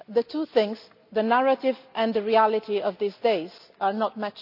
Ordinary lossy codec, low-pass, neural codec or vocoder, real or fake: none; 5.4 kHz; none; real